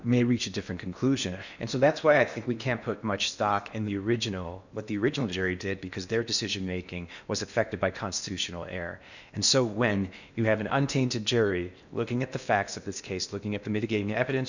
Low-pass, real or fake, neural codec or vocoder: 7.2 kHz; fake; codec, 16 kHz in and 24 kHz out, 0.8 kbps, FocalCodec, streaming, 65536 codes